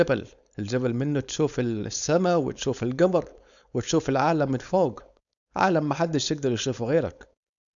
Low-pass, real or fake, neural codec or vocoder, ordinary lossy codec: 7.2 kHz; fake; codec, 16 kHz, 4.8 kbps, FACodec; none